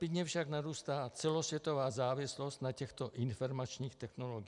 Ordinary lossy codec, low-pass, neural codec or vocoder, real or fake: MP3, 96 kbps; 10.8 kHz; none; real